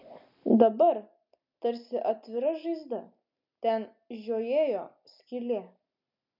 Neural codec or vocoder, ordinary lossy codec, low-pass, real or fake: none; MP3, 48 kbps; 5.4 kHz; real